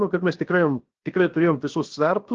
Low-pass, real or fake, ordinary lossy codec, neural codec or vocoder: 7.2 kHz; fake; Opus, 16 kbps; codec, 16 kHz, 0.7 kbps, FocalCodec